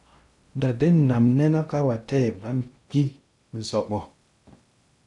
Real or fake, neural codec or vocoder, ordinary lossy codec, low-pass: fake; codec, 16 kHz in and 24 kHz out, 0.6 kbps, FocalCodec, streaming, 2048 codes; MP3, 96 kbps; 10.8 kHz